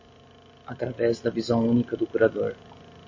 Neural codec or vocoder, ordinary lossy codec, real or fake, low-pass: none; MP3, 32 kbps; real; 7.2 kHz